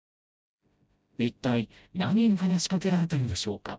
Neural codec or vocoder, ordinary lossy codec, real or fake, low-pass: codec, 16 kHz, 0.5 kbps, FreqCodec, smaller model; none; fake; none